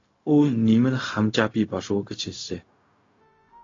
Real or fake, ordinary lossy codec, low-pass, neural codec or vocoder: fake; AAC, 32 kbps; 7.2 kHz; codec, 16 kHz, 0.4 kbps, LongCat-Audio-Codec